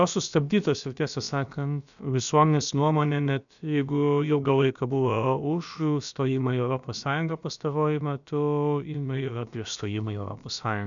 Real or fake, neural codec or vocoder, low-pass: fake; codec, 16 kHz, about 1 kbps, DyCAST, with the encoder's durations; 7.2 kHz